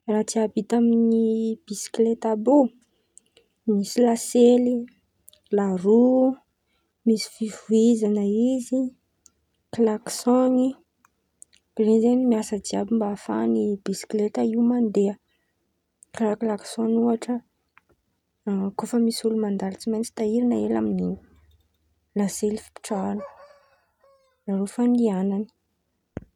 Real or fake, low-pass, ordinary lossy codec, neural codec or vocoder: real; 19.8 kHz; none; none